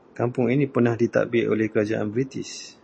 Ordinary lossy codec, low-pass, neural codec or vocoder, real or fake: MP3, 32 kbps; 9.9 kHz; none; real